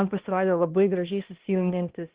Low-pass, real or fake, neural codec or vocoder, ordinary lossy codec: 3.6 kHz; fake; codec, 16 kHz, 1 kbps, X-Codec, HuBERT features, trained on balanced general audio; Opus, 16 kbps